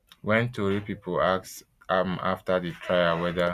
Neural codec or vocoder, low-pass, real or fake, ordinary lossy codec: none; 14.4 kHz; real; none